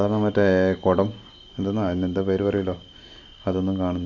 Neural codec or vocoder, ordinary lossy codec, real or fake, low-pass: none; none; real; 7.2 kHz